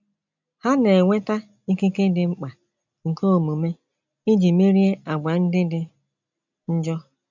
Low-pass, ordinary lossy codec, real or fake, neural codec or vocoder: 7.2 kHz; none; real; none